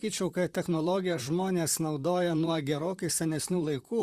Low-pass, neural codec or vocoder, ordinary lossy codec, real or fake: 14.4 kHz; vocoder, 44.1 kHz, 128 mel bands, Pupu-Vocoder; Opus, 64 kbps; fake